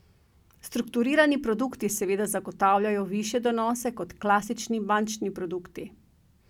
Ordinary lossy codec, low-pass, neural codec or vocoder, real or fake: none; 19.8 kHz; none; real